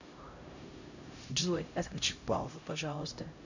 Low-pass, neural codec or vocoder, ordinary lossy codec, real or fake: 7.2 kHz; codec, 16 kHz, 0.5 kbps, X-Codec, HuBERT features, trained on LibriSpeech; none; fake